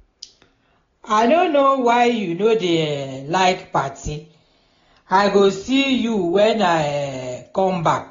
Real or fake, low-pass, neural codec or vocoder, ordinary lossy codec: real; 7.2 kHz; none; AAC, 32 kbps